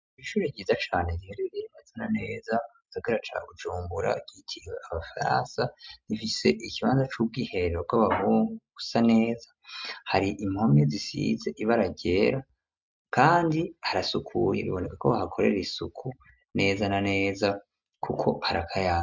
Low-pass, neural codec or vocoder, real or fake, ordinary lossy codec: 7.2 kHz; none; real; MP3, 64 kbps